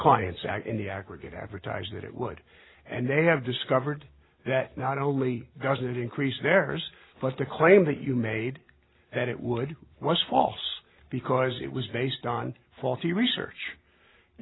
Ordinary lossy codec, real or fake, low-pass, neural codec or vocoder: AAC, 16 kbps; real; 7.2 kHz; none